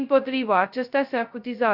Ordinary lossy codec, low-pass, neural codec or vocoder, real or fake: Opus, 64 kbps; 5.4 kHz; codec, 16 kHz, 0.2 kbps, FocalCodec; fake